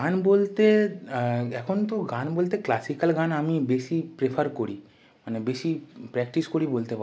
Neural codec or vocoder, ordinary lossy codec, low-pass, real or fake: none; none; none; real